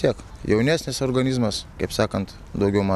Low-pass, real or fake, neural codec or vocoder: 14.4 kHz; real; none